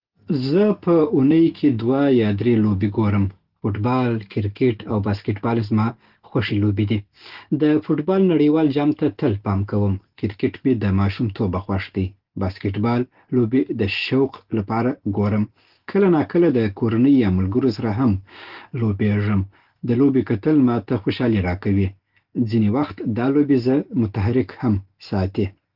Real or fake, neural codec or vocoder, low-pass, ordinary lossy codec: real; none; 5.4 kHz; Opus, 16 kbps